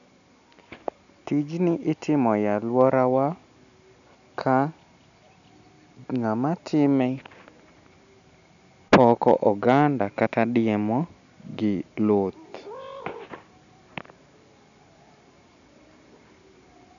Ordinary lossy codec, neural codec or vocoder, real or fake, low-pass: none; none; real; 7.2 kHz